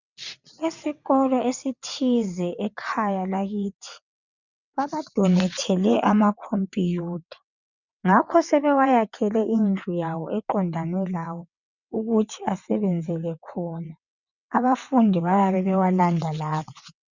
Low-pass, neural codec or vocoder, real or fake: 7.2 kHz; vocoder, 22.05 kHz, 80 mel bands, WaveNeXt; fake